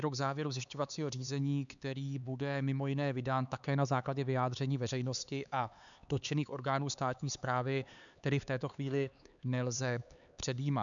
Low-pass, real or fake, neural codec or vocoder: 7.2 kHz; fake; codec, 16 kHz, 4 kbps, X-Codec, HuBERT features, trained on LibriSpeech